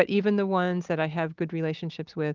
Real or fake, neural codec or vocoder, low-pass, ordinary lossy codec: real; none; 7.2 kHz; Opus, 32 kbps